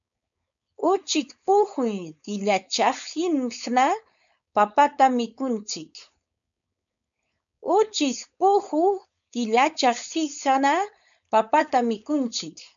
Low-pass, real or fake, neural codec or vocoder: 7.2 kHz; fake; codec, 16 kHz, 4.8 kbps, FACodec